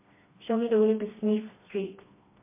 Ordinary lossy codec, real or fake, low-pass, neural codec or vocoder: MP3, 24 kbps; fake; 3.6 kHz; codec, 16 kHz, 2 kbps, FreqCodec, smaller model